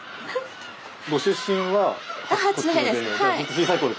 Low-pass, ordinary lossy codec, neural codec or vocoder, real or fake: none; none; none; real